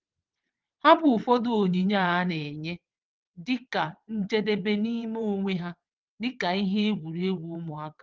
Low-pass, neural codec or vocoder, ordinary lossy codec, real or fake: 7.2 kHz; vocoder, 22.05 kHz, 80 mel bands, WaveNeXt; Opus, 32 kbps; fake